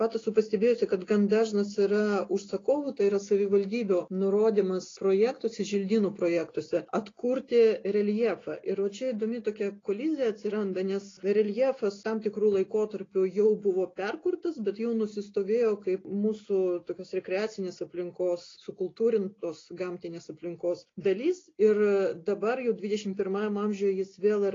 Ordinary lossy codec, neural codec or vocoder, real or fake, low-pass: AAC, 32 kbps; none; real; 7.2 kHz